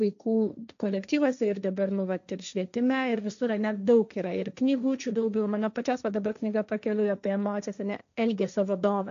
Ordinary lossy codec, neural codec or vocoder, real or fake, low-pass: AAC, 96 kbps; codec, 16 kHz, 1.1 kbps, Voila-Tokenizer; fake; 7.2 kHz